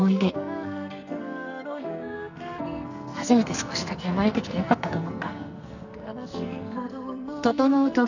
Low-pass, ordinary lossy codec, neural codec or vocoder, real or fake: 7.2 kHz; none; codec, 44.1 kHz, 2.6 kbps, SNAC; fake